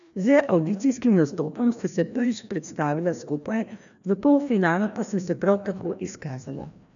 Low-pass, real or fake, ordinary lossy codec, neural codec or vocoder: 7.2 kHz; fake; MP3, 96 kbps; codec, 16 kHz, 1 kbps, FreqCodec, larger model